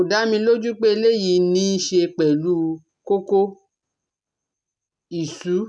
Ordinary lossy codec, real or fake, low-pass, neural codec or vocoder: none; real; 9.9 kHz; none